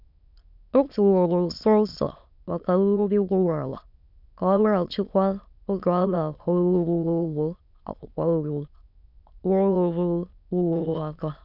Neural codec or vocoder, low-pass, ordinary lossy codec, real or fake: autoencoder, 22.05 kHz, a latent of 192 numbers a frame, VITS, trained on many speakers; 5.4 kHz; none; fake